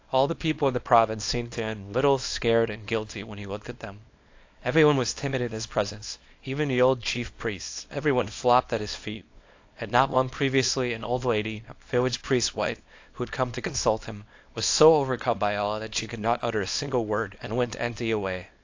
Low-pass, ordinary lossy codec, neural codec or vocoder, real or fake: 7.2 kHz; AAC, 48 kbps; codec, 24 kHz, 0.9 kbps, WavTokenizer, small release; fake